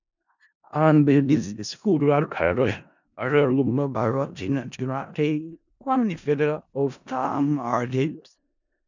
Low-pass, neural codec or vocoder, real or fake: 7.2 kHz; codec, 16 kHz in and 24 kHz out, 0.4 kbps, LongCat-Audio-Codec, four codebook decoder; fake